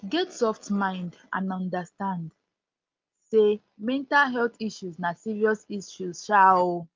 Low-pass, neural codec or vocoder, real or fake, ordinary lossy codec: 7.2 kHz; none; real; Opus, 24 kbps